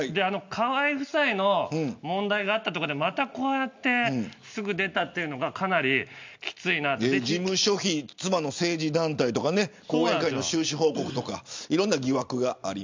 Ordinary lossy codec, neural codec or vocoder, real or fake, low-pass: MP3, 64 kbps; none; real; 7.2 kHz